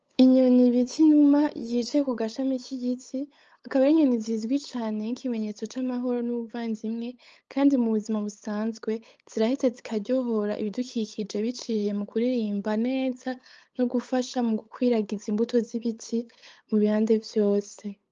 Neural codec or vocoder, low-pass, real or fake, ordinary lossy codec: codec, 16 kHz, 8 kbps, FunCodec, trained on LibriTTS, 25 frames a second; 7.2 kHz; fake; Opus, 24 kbps